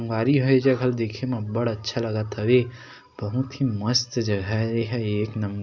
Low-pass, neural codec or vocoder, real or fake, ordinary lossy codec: 7.2 kHz; none; real; none